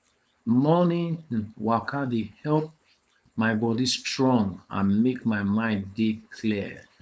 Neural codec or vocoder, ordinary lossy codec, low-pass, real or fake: codec, 16 kHz, 4.8 kbps, FACodec; none; none; fake